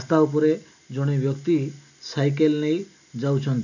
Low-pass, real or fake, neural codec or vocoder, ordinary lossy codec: 7.2 kHz; real; none; none